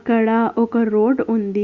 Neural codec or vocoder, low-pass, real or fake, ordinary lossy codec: none; 7.2 kHz; real; Opus, 64 kbps